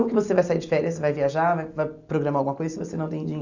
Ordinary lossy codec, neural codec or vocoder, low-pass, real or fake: none; none; 7.2 kHz; real